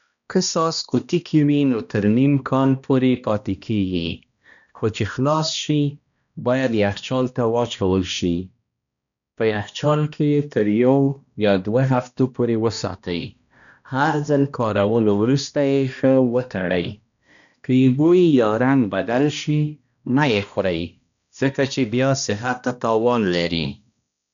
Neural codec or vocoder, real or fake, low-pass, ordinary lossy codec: codec, 16 kHz, 1 kbps, X-Codec, HuBERT features, trained on balanced general audio; fake; 7.2 kHz; none